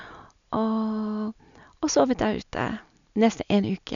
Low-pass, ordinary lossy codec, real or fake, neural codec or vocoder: 7.2 kHz; none; real; none